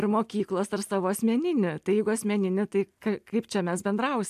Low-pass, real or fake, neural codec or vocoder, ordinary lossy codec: 14.4 kHz; real; none; AAC, 96 kbps